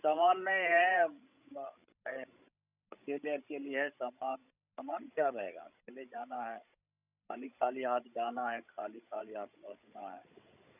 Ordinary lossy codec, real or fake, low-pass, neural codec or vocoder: none; fake; 3.6 kHz; codec, 16 kHz, 16 kbps, FreqCodec, larger model